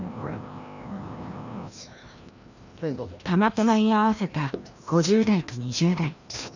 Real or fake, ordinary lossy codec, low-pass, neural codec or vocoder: fake; none; 7.2 kHz; codec, 16 kHz, 1 kbps, FreqCodec, larger model